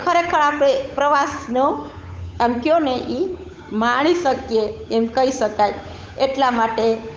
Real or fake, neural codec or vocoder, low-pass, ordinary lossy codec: fake; codec, 16 kHz, 8 kbps, FunCodec, trained on Chinese and English, 25 frames a second; none; none